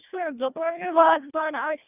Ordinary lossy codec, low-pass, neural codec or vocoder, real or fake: none; 3.6 kHz; codec, 24 kHz, 1.5 kbps, HILCodec; fake